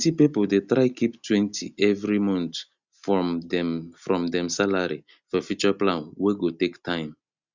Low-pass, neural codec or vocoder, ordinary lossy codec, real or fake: 7.2 kHz; none; Opus, 64 kbps; real